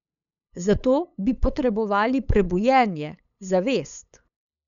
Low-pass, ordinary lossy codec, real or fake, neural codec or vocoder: 7.2 kHz; none; fake; codec, 16 kHz, 8 kbps, FunCodec, trained on LibriTTS, 25 frames a second